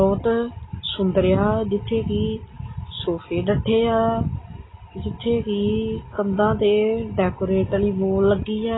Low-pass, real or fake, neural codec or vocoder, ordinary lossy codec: 7.2 kHz; real; none; AAC, 16 kbps